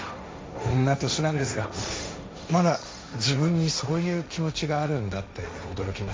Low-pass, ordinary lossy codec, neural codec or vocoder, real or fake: none; none; codec, 16 kHz, 1.1 kbps, Voila-Tokenizer; fake